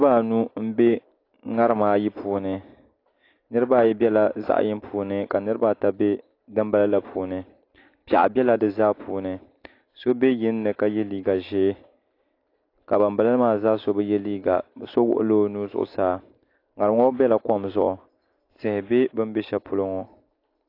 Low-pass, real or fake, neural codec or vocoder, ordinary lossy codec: 5.4 kHz; real; none; AAC, 32 kbps